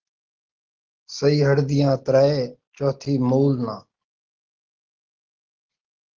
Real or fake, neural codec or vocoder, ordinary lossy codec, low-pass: real; none; Opus, 16 kbps; 7.2 kHz